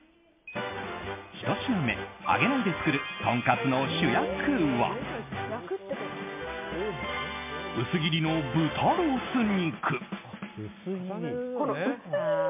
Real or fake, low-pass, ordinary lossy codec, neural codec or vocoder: real; 3.6 kHz; MP3, 24 kbps; none